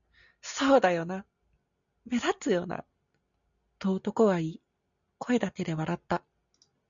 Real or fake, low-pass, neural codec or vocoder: real; 7.2 kHz; none